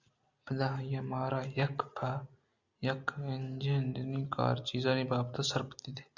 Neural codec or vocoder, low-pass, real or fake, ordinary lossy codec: none; 7.2 kHz; real; Opus, 64 kbps